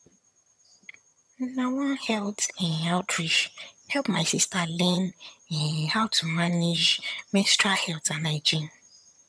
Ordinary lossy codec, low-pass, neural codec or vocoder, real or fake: none; none; vocoder, 22.05 kHz, 80 mel bands, HiFi-GAN; fake